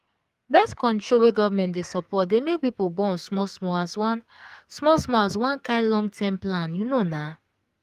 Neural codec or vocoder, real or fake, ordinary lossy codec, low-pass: codec, 44.1 kHz, 2.6 kbps, SNAC; fake; Opus, 32 kbps; 14.4 kHz